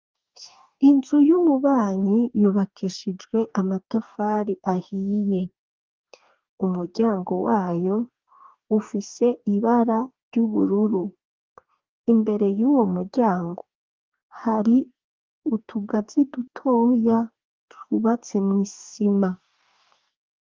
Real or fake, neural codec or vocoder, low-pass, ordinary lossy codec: fake; codec, 44.1 kHz, 2.6 kbps, DAC; 7.2 kHz; Opus, 32 kbps